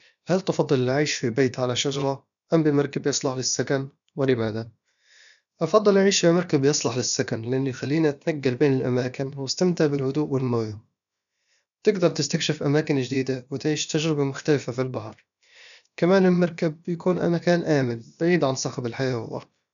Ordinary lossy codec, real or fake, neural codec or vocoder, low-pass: none; fake; codec, 16 kHz, about 1 kbps, DyCAST, with the encoder's durations; 7.2 kHz